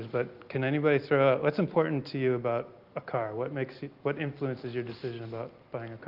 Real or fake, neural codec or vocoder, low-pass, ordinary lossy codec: real; none; 5.4 kHz; Opus, 32 kbps